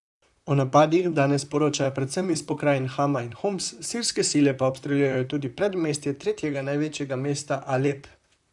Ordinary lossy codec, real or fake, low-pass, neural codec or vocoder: none; fake; 10.8 kHz; vocoder, 44.1 kHz, 128 mel bands, Pupu-Vocoder